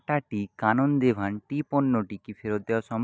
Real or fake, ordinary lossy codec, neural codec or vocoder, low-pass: real; none; none; none